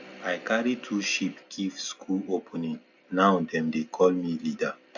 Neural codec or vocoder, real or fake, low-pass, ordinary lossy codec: none; real; 7.2 kHz; none